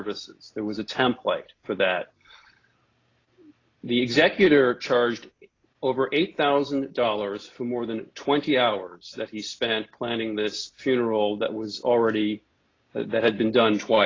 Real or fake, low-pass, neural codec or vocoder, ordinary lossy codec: real; 7.2 kHz; none; AAC, 32 kbps